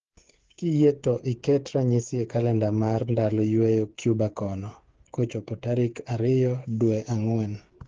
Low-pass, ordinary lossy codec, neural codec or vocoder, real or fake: 7.2 kHz; Opus, 16 kbps; codec, 16 kHz, 8 kbps, FreqCodec, smaller model; fake